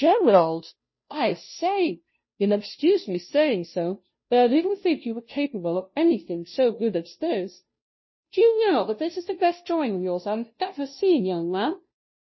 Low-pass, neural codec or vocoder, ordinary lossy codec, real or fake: 7.2 kHz; codec, 16 kHz, 0.5 kbps, FunCodec, trained on Chinese and English, 25 frames a second; MP3, 24 kbps; fake